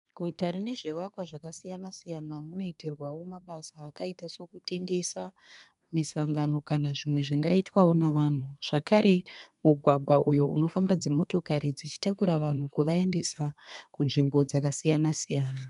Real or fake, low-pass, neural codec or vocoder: fake; 10.8 kHz; codec, 24 kHz, 1 kbps, SNAC